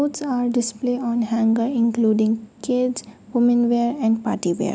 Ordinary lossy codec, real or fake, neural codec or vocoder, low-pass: none; real; none; none